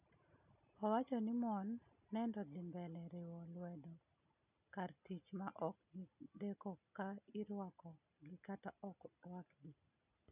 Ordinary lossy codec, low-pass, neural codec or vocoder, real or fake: none; 3.6 kHz; none; real